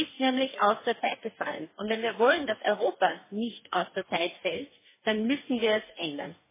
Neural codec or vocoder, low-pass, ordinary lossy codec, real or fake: codec, 44.1 kHz, 2.6 kbps, DAC; 3.6 kHz; MP3, 16 kbps; fake